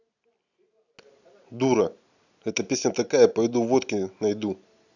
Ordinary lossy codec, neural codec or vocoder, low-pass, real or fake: none; none; 7.2 kHz; real